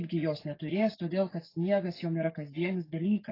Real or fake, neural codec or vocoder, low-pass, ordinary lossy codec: fake; codec, 44.1 kHz, 7.8 kbps, DAC; 5.4 kHz; AAC, 24 kbps